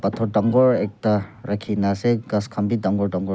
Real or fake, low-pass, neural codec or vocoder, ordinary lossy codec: real; none; none; none